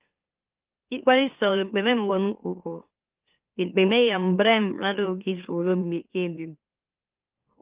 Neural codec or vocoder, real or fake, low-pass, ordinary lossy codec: autoencoder, 44.1 kHz, a latent of 192 numbers a frame, MeloTTS; fake; 3.6 kHz; Opus, 32 kbps